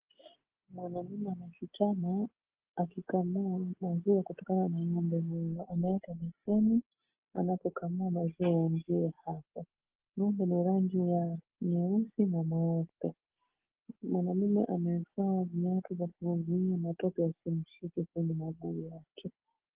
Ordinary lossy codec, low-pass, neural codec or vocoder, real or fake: Opus, 16 kbps; 3.6 kHz; none; real